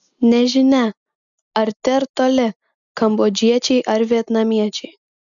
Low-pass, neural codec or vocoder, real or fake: 7.2 kHz; none; real